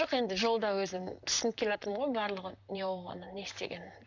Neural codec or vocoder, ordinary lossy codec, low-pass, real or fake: vocoder, 44.1 kHz, 128 mel bands, Pupu-Vocoder; none; 7.2 kHz; fake